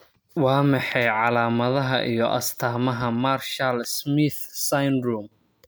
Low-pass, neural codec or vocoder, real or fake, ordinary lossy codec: none; none; real; none